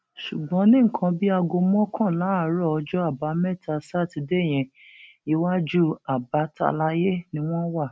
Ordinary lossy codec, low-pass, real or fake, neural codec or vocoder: none; none; real; none